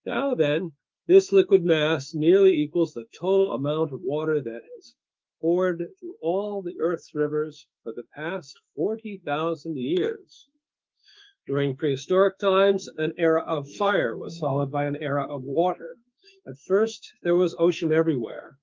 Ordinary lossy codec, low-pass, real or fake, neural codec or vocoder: Opus, 24 kbps; 7.2 kHz; fake; autoencoder, 48 kHz, 32 numbers a frame, DAC-VAE, trained on Japanese speech